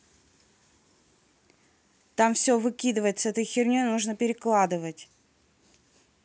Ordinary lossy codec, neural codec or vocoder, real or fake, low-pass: none; none; real; none